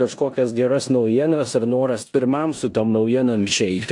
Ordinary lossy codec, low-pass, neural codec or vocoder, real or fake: AAC, 64 kbps; 10.8 kHz; codec, 16 kHz in and 24 kHz out, 0.9 kbps, LongCat-Audio-Codec, four codebook decoder; fake